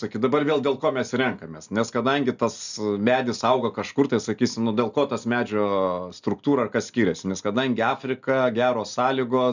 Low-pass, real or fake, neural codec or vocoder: 7.2 kHz; real; none